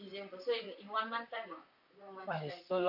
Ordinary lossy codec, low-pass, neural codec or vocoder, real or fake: none; 5.4 kHz; vocoder, 44.1 kHz, 128 mel bands, Pupu-Vocoder; fake